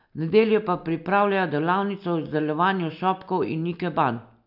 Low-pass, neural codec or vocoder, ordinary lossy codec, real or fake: 5.4 kHz; none; none; real